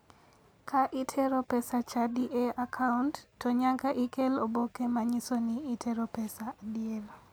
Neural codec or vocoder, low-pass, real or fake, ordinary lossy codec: none; none; real; none